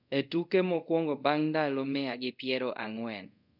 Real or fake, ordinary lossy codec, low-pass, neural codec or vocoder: fake; none; 5.4 kHz; codec, 24 kHz, 0.5 kbps, DualCodec